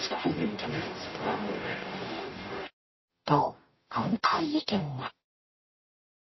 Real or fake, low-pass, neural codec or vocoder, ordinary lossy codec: fake; 7.2 kHz; codec, 44.1 kHz, 0.9 kbps, DAC; MP3, 24 kbps